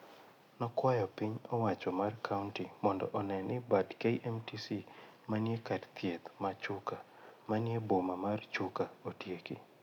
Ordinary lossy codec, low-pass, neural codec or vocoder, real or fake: none; 19.8 kHz; none; real